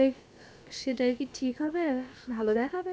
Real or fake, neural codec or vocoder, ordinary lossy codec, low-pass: fake; codec, 16 kHz, about 1 kbps, DyCAST, with the encoder's durations; none; none